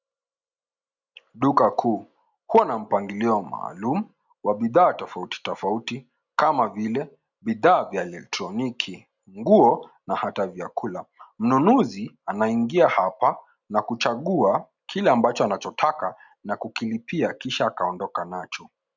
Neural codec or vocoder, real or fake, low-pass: none; real; 7.2 kHz